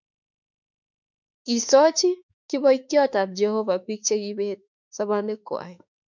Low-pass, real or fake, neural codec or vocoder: 7.2 kHz; fake; autoencoder, 48 kHz, 32 numbers a frame, DAC-VAE, trained on Japanese speech